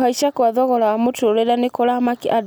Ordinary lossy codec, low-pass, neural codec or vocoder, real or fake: none; none; none; real